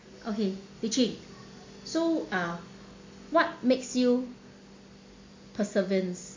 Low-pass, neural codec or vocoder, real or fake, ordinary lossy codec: 7.2 kHz; none; real; MP3, 48 kbps